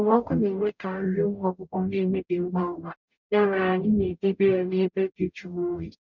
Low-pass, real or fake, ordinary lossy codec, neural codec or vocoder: 7.2 kHz; fake; none; codec, 44.1 kHz, 0.9 kbps, DAC